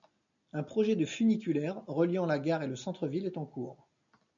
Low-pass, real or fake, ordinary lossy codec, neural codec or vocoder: 7.2 kHz; real; MP3, 96 kbps; none